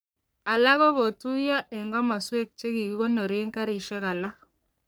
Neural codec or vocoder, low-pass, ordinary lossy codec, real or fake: codec, 44.1 kHz, 3.4 kbps, Pupu-Codec; none; none; fake